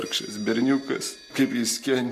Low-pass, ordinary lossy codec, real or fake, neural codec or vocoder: 14.4 kHz; MP3, 64 kbps; real; none